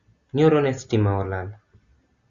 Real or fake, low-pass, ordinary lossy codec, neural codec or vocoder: real; 7.2 kHz; Opus, 64 kbps; none